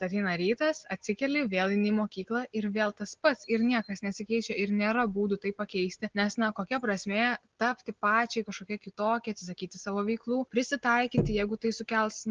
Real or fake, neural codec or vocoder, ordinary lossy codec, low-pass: real; none; Opus, 16 kbps; 7.2 kHz